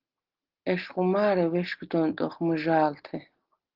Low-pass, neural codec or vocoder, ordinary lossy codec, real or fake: 5.4 kHz; none; Opus, 16 kbps; real